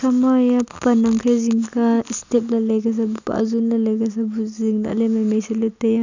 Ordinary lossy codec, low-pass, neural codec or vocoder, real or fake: none; 7.2 kHz; none; real